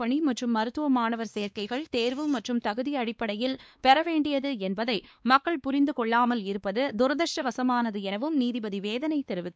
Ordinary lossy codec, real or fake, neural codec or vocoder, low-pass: none; fake; codec, 16 kHz, 2 kbps, X-Codec, WavLM features, trained on Multilingual LibriSpeech; none